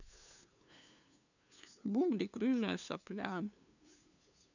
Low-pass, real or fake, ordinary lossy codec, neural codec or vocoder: 7.2 kHz; fake; none; codec, 16 kHz, 2 kbps, FunCodec, trained on LibriTTS, 25 frames a second